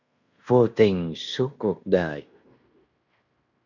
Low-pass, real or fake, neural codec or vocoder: 7.2 kHz; fake; codec, 16 kHz in and 24 kHz out, 0.9 kbps, LongCat-Audio-Codec, fine tuned four codebook decoder